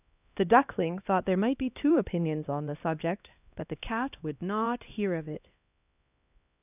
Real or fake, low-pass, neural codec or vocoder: fake; 3.6 kHz; codec, 16 kHz, 1 kbps, X-Codec, HuBERT features, trained on LibriSpeech